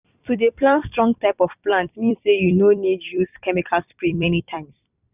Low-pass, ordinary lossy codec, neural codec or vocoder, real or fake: 3.6 kHz; none; vocoder, 44.1 kHz, 128 mel bands every 256 samples, BigVGAN v2; fake